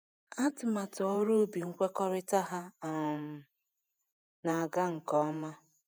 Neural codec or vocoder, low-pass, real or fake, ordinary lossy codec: vocoder, 48 kHz, 128 mel bands, Vocos; none; fake; none